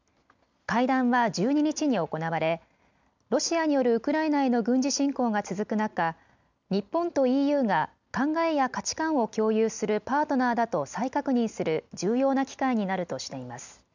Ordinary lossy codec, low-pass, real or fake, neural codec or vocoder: none; 7.2 kHz; real; none